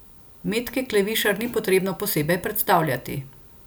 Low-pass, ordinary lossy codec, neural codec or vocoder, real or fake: none; none; none; real